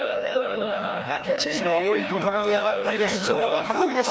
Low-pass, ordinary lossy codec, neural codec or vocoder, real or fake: none; none; codec, 16 kHz, 1 kbps, FreqCodec, larger model; fake